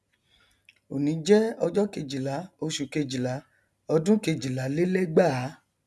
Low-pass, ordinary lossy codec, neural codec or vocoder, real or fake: none; none; none; real